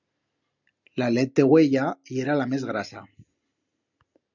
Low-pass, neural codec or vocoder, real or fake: 7.2 kHz; none; real